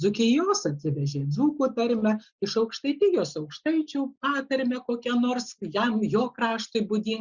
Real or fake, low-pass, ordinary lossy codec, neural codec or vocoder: real; 7.2 kHz; Opus, 64 kbps; none